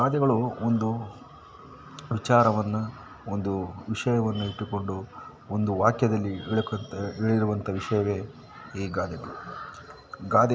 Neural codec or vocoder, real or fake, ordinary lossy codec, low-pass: none; real; none; none